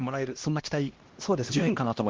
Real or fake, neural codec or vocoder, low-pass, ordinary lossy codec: fake; codec, 16 kHz, 1 kbps, X-Codec, HuBERT features, trained on LibriSpeech; 7.2 kHz; Opus, 16 kbps